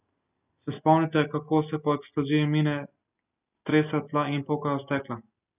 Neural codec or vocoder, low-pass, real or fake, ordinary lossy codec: none; 3.6 kHz; real; none